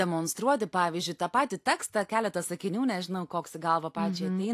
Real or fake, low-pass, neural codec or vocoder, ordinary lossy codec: real; 14.4 kHz; none; AAC, 64 kbps